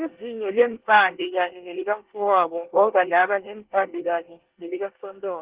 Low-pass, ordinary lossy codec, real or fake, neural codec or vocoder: 3.6 kHz; Opus, 16 kbps; fake; codec, 24 kHz, 1 kbps, SNAC